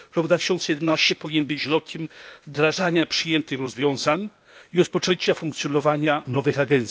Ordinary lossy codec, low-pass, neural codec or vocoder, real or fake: none; none; codec, 16 kHz, 0.8 kbps, ZipCodec; fake